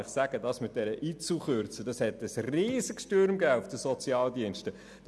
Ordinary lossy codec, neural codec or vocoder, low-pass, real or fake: none; none; none; real